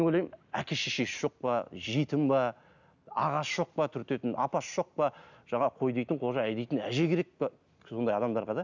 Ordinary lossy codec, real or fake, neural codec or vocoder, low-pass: none; real; none; 7.2 kHz